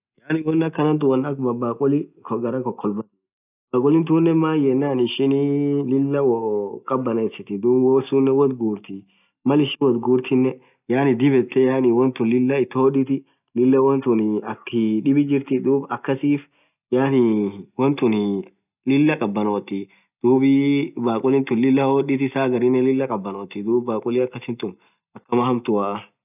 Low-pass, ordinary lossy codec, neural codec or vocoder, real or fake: 3.6 kHz; none; none; real